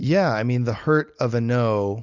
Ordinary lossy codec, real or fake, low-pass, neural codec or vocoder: Opus, 64 kbps; real; 7.2 kHz; none